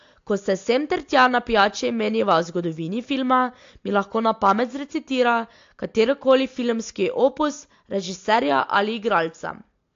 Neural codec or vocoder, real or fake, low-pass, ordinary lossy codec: none; real; 7.2 kHz; AAC, 48 kbps